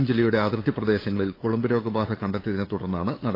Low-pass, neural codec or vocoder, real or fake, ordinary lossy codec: 5.4 kHz; codec, 16 kHz, 8 kbps, FunCodec, trained on LibriTTS, 25 frames a second; fake; AAC, 24 kbps